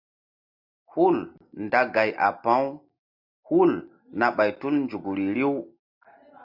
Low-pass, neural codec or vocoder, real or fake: 5.4 kHz; none; real